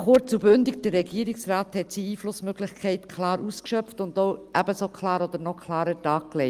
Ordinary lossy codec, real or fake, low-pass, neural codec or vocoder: Opus, 32 kbps; real; 14.4 kHz; none